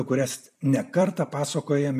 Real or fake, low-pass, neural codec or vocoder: fake; 14.4 kHz; vocoder, 44.1 kHz, 128 mel bands every 256 samples, BigVGAN v2